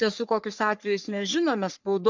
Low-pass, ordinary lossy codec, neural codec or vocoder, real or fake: 7.2 kHz; MP3, 64 kbps; codec, 44.1 kHz, 3.4 kbps, Pupu-Codec; fake